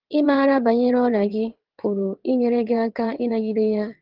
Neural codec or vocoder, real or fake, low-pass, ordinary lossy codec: vocoder, 22.05 kHz, 80 mel bands, WaveNeXt; fake; 5.4 kHz; Opus, 16 kbps